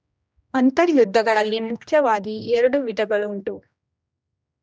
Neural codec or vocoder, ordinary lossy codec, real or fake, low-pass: codec, 16 kHz, 1 kbps, X-Codec, HuBERT features, trained on general audio; none; fake; none